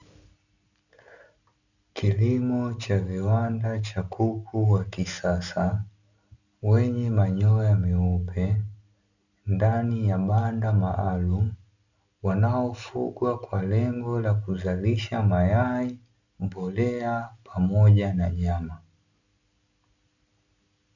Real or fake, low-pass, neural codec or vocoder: real; 7.2 kHz; none